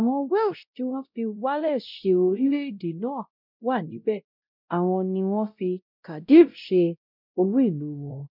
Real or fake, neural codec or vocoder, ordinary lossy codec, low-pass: fake; codec, 16 kHz, 0.5 kbps, X-Codec, WavLM features, trained on Multilingual LibriSpeech; none; 5.4 kHz